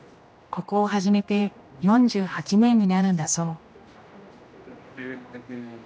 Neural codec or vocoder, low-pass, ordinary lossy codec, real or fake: codec, 16 kHz, 1 kbps, X-Codec, HuBERT features, trained on general audio; none; none; fake